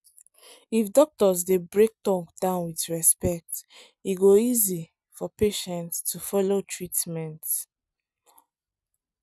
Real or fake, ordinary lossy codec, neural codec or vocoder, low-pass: real; none; none; none